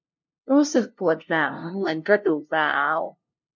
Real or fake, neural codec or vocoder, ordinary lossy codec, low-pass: fake; codec, 16 kHz, 0.5 kbps, FunCodec, trained on LibriTTS, 25 frames a second; MP3, 48 kbps; 7.2 kHz